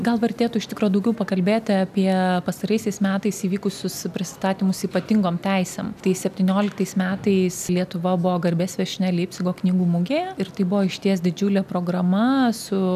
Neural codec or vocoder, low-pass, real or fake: none; 14.4 kHz; real